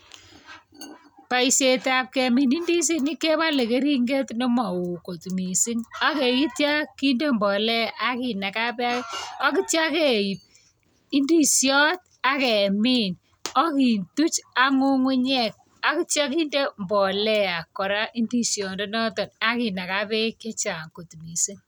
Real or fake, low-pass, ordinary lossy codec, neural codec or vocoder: real; none; none; none